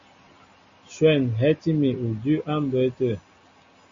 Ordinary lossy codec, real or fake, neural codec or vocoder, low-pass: MP3, 48 kbps; real; none; 7.2 kHz